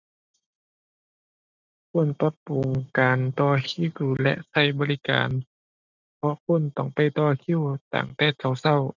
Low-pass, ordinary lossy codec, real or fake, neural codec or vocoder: 7.2 kHz; none; real; none